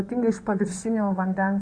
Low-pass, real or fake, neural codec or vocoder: 9.9 kHz; fake; codec, 16 kHz in and 24 kHz out, 2.2 kbps, FireRedTTS-2 codec